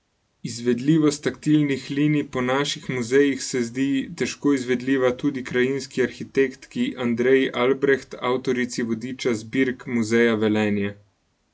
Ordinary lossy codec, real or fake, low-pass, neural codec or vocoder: none; real; none; none